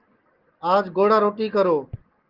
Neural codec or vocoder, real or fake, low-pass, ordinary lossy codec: none; real; 5.4 kHz; Opus, 16 kbps